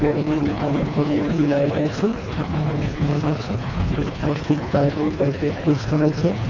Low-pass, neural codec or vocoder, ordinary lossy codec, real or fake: 7.2 kHz; codec, 24 kHz, 1.5 kbps, HILCodec; MP3, 48 kbps; fake